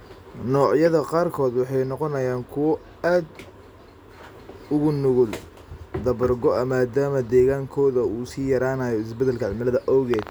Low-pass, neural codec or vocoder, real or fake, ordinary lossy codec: none; none; real; none